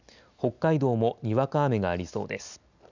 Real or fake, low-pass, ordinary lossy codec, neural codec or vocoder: real; 7.2 kHz; none; none